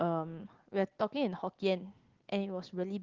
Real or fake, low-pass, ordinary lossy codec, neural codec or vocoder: real; 7.2 kHz; Opus, 16 kbps; none